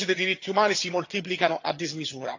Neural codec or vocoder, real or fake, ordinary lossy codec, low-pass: vocoder, 22.05 kHz, 80 mel bands, HiFi-GAN; fake; AAC, 48 kbps; 7.2 kHz